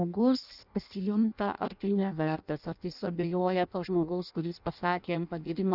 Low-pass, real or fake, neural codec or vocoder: 5.4 kHz; fake; codec, 16 kHz in and 24 kHz out, 0.6 kbps, FireRedTTS-2 codec